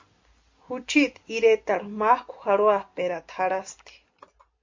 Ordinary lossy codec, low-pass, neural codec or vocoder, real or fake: MP3, 48 kbps; 7.2 kHz; none; real